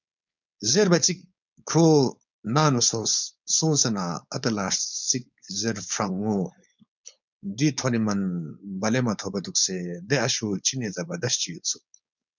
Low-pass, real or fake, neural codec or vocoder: 7.2 kHz; fake; codec, 16 kHz, 4.8 kbps, FACodec